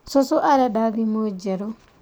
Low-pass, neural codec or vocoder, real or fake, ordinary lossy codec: none; none; real; none